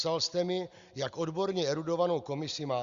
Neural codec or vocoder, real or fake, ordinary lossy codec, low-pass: none; real; Opus, 64 kbps; 7.2 kHz